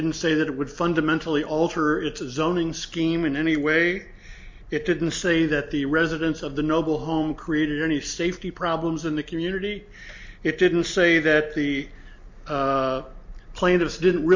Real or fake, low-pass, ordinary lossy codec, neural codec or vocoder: real; 7.2 kHz; MP3, 48 kbps; none